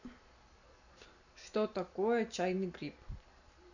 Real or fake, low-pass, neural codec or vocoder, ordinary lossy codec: real; 7.2 kHz; none; none